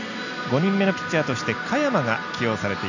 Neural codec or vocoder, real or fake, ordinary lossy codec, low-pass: none; real; none; 7.2 kHz